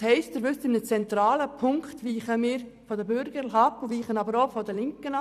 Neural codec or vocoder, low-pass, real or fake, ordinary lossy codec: vocoder, 44.1 kHz, 128 mel bands every 256 samples, BigVGAN v2; 14.4 kHz; fake; none